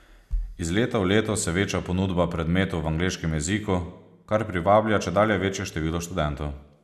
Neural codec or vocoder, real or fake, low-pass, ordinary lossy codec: none; real; 14.4 kHz; none